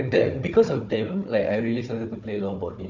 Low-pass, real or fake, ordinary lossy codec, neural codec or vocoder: 7.2 kHz; fake; none; codec, 16 kHz, 4 kbps, FunCodec, trained on Chinese and English, 50 frames a second